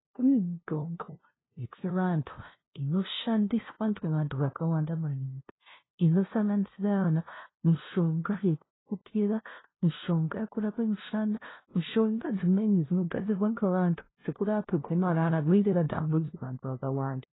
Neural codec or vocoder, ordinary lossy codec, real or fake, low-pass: codec, 16 kHz, 0.5 kbps, FunCodec, trained on LibriTTS, 25 frames a second; AAC, 16 kbps; fake; 7.2 kHz